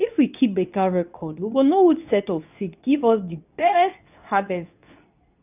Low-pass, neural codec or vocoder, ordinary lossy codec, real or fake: 3.6 kHz; codec, 24 kHz, 0.9 kbps, WavTokenizer, medium speech release version 2; none; fake